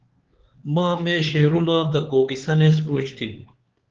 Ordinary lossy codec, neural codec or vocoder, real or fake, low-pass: Opus, 16 kbps; codec, 16 kHz, 4 kbps, X-Codec, HuBERT features, trained on LibriSpeech; fake; 7.2 kHz